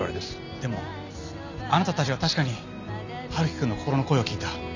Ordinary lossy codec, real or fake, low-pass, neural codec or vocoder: none; real; 7.2 kHz; none